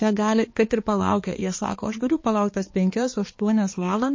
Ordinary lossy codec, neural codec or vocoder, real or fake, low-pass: MP3, 32 kbps; codec, 16 kHz, 2 kbps, X-Codec, HuBERT features, trained on balanced general audio; fake; 7.2 kHz